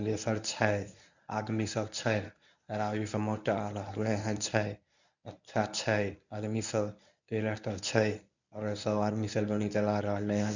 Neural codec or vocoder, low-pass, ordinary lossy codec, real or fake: codec, 24 kHz, 0.9 kbps, WavTokenizer, medium speech release version 1; 7.2 kHz; none; fake